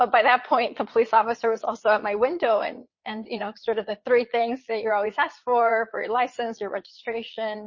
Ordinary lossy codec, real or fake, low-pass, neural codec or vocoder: MP3, 32 kbps; fake; 7.2 kHz; vocoder, 22.05 kHz, 80 mel bands, Vocos